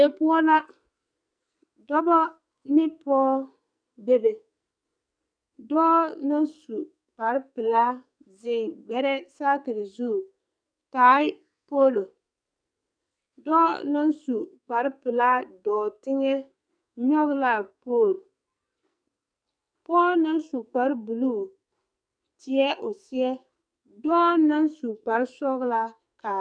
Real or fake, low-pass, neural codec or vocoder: fake; 9.9 kHz; codec, 44.1 kHz, 2.6 kbps, SNAC